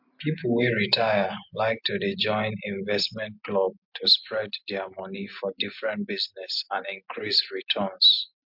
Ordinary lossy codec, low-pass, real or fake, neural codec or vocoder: MP3, 48 kbps; 5.4 kHz; real; none